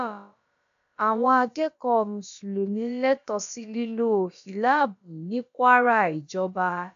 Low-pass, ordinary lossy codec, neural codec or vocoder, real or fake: 7.2 kHz; AAC, 96 kbps; codec, 16 kHz, about 1 kbps, DyCAST, with the encoder's durations; fake